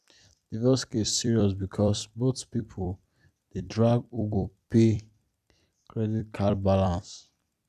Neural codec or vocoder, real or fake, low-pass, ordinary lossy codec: vocoder, 44.1 kHz, 128 mel bands every 256 samples, BigVGAN v2; fake; 14.4 kHz; none